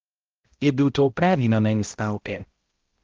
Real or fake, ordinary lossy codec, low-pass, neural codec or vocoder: fake; Opus, 16 kbps; 7.2 kHz; codec, 16 kHz, 0.5 kbps, X-Codec, HuBERT features, trained on balanced general audio